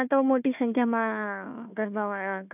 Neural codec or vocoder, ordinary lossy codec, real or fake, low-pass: codec, 16 kHz, 1 kbps, FunCodec, trained on Chinese and English, 50 frames a second; none; fake; 3.6 kHz